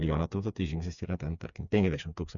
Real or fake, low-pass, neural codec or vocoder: fake; 7.2 kHz; codec, 16 kHz, 4 kbps, FreqCodec, smaller model